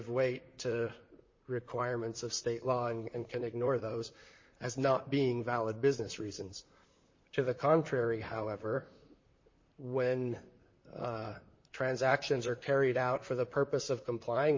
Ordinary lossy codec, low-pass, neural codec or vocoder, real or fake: MP3, 32 kbps; 7.2 kHz; vocoder, 44.1 kHz, 128 mel bands, Pupu-Vocoder; fake